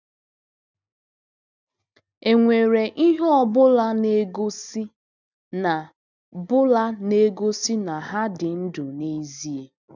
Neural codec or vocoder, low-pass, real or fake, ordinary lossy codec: none; 7.2 kHz; real; none